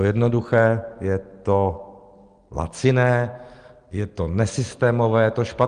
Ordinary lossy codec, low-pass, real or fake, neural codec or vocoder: Opus, 24 kbps; 9.9 kHz; real; none